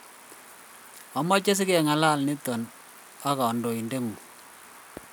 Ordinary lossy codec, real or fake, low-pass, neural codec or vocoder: none; real; none; none